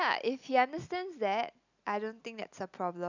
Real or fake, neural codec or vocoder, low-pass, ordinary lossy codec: real; none; 7.2 kHz; none